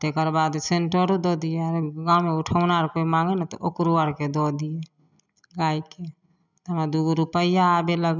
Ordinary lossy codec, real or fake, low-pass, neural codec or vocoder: none; real; 7.2 kHz; none